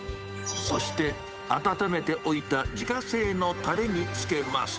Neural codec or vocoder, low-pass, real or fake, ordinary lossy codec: codec, 16 kHz, 8 kbps, FunCodec, trained on Chinese and English, 25 frames a second; none; fake; none